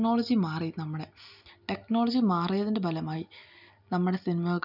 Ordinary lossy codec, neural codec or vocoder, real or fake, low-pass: none; none; real; 5.4 kHz